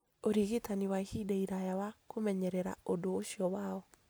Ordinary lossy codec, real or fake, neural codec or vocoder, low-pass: none; real; none; none